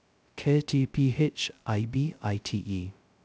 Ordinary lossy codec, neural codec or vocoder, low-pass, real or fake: none; codec, 16 kHz, 0.2 kbps, FocalCodec; none; fake